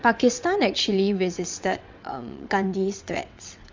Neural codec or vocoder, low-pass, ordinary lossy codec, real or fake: vocoder, 22.05 kHz, 80 mel bands, WaveNeXt; 7.2 kHz; MP3, 64 kbps; fake